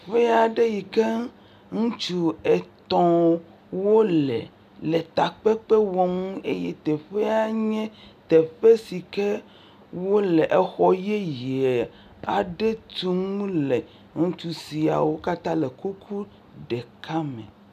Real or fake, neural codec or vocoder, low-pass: real; none; 14.4 kHz